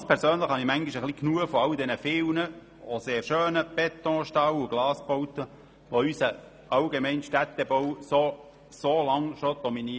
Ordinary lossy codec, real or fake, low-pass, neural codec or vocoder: none; real; none; none